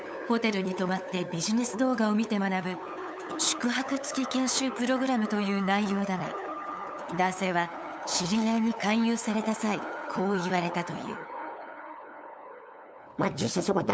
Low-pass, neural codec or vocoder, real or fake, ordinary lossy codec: none; codec, 16 kHz, 8 kbps, FunCodec, trained on LibriTTS, 25 frames a second; fake; none